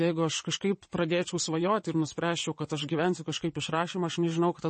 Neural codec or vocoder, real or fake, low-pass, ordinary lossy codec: vocoder, 22.05 kHz, 80 mel bands, WaveNeXt; fake; 9.9 kHz; MP3, 32 kbps